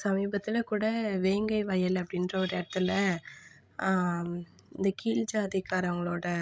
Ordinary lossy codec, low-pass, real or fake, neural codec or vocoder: none; none; fake; codec, 16 kHz, 16 kbps, FreqCodec, larger model